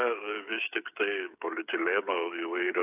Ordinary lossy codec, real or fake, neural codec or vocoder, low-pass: AAC, 32 kbps; fake; codec, 16 kHz, 16 kbps, FreqCodec, smaller model; 3.6 kHz